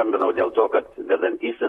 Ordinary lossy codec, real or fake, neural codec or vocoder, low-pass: AAC, 32 kbps; fake; vocoder, 44.1 kHz, 128 mel bands, Pupu-Vocoder; 19.8 kHz